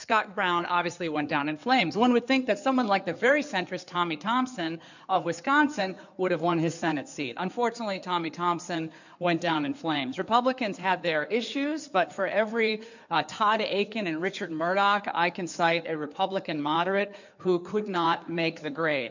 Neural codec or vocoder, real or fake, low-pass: codec, 16 kHz in and 24 kHz out, 2.2 kbps, FireRedTTS-2 codec; fake; 7.2 kHz